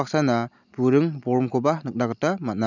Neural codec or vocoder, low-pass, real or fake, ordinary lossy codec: none; 7.2 kHz; real; none